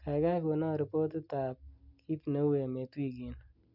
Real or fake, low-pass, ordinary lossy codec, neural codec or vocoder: real; 5.4 kHz; none; none